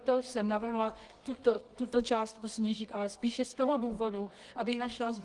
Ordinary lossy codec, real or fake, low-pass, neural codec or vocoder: Opus, 24 kbps; fake; 10.8 kHz; codec, 24 kHz, 0.9 kbps, WavTokenizer, medium music audio release